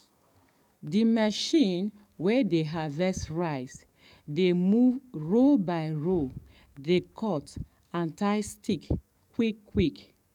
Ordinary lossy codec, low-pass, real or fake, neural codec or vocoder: none; 19.8 kHz; fake; codec, 44.1 kHz, 7.8 kbps, DAC